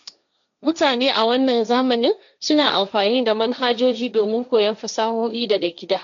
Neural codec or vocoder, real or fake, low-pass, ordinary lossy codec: codec, 16 kHz, 1.1 kbps, Voila-Tokenizer; fake; 7.2 kHz; none